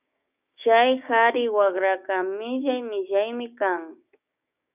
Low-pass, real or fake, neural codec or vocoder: 3.6 kHz; fake; codec, 16 kHz, 6 kbps, DAC